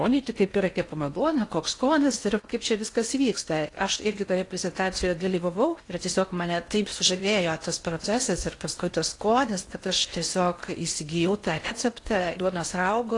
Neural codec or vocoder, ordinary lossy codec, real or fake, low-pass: codec, 16 kHz in and 24 kHz out, 0.8 kbps, FocalCodec, streaming, 65536 codes; AAC, 48 kbps; fake; 10.8 kHz